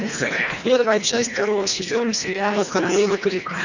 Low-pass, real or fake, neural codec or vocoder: 7.2 kHz; fake; codec, 24 kHz, 1.5 kbps, HILCodec